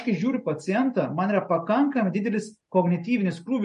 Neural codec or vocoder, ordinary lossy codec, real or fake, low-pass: none; MP3, 48 kbps; real; 14.4 kHz